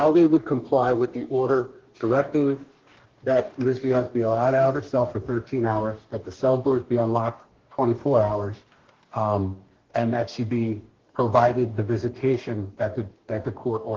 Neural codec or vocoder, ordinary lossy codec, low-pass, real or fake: codec, 44.1 kHz, 2.6 kbps, DAC; Opus, 16 kbps; 7.2 kHz; fake